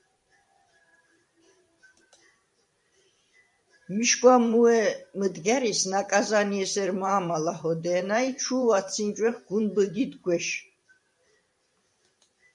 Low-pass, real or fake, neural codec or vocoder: 10.8 kHz; fake; vocoder, 44.1 kHz, 128 mel bands every 512 samples, BigVGAN v2